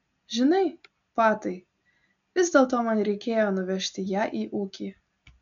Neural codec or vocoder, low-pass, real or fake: none; 7.2 kHz; real